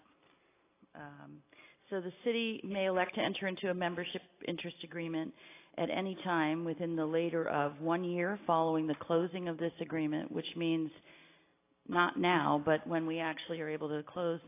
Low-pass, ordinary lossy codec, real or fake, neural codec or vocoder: 3.6 kHz; AAC, 24 kbps; real; none